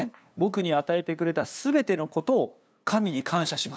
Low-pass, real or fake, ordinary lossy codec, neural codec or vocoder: none; fake; none; codec, 16 kHz, 2 kbps, FunCodec, trained on LibriTTS, 25 frames a second